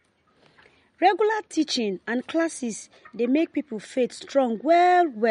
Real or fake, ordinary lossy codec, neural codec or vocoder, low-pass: real; MP3, 48 kbps; none; 10.8 kHz